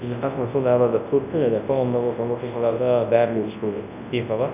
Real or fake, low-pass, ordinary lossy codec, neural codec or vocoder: fake; 3.6 kHz; none; codec, 24 kHz, 0.9 kbps, WavTokenizer, large speech release